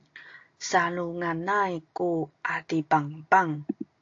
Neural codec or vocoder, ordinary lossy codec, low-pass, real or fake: none; AAC, 48 kbps; 7.2 kHz; real